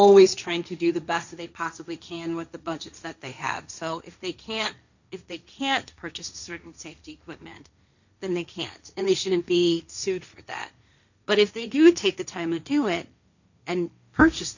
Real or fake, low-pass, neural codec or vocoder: fake; 7.2 kHz; codec, 16 kHz, 1.1 kbps, Voila-Tokenizer